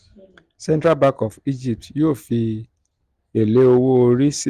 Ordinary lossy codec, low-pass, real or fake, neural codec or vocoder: Opus, 24 kbps; 14.4 kHz; real; none